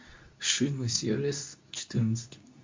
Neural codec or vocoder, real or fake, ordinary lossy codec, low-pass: codec, 24 kHz, 0.9 kbps, WavTokenizer, medium speech release version 2; fake; MP3, 48 kbps; 7.2 kHz